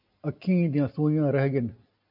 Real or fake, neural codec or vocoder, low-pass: real; none; 5.4 kHz